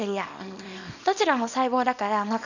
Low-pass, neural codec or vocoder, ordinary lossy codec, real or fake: 7.2 kHz; codec, 24 kHz, 0.9 kbps, WavTokenizer, small release; none; fake